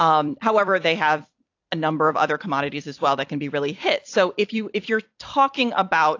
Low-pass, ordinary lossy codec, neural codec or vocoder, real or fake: 7.2 kHz; AAC, 48 kbps; vocoder, 44.1 kHz, 128 mel bands every 256 samples, BigVGAN v2; fake